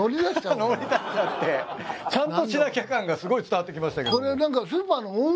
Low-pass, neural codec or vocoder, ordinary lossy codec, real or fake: none; none; none; real